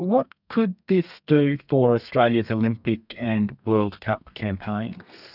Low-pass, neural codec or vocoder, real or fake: 5.4 kHz; codec, 32 kHz, 1.9 kbps, SNAC; fake